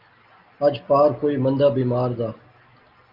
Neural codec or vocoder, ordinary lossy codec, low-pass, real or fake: none; Opus, 24 kbps; 5.4 kHz; real